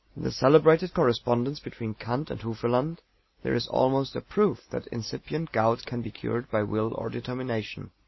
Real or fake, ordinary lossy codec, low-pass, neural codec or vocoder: real; MP3, 24 kbps; 7.2 kHz; none